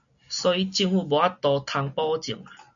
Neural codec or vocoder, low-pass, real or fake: none; 7.2 kHz; real